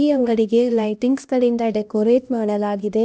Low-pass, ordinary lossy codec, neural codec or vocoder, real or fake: none; none; codec, 16 kHz, 1 kbps, X-Codec, HuBERT features, trained on LibriSpeech; fake